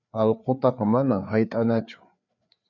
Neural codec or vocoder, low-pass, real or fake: codec, 16 kHz, 4 kbps, FreqCodec, larger model; 7.2 kHz; fake